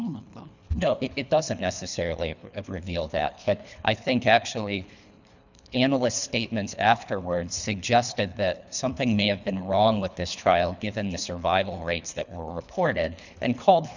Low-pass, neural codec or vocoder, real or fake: 7.2 kHz; codec, 24 kHz, 3 kbps, HILCodec; fake